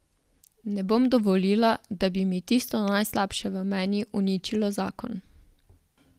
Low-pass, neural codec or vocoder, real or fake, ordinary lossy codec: 14.4 kHz; none; real; Opus, 24 kbps